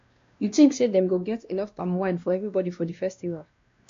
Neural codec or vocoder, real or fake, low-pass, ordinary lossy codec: codec, 16 kHz, 1 kbps, X-Codec, WavLM features, trained on Multilingual LibriSpeech; fake; 7.2 kHz; MP3, 48 kbps